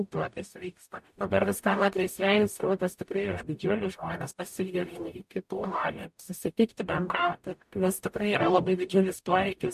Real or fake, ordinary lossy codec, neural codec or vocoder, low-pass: fake; MP3, 64 kbps; codec, 44.1 kHz, 0.9 kbps, DAC; 14.4 kHz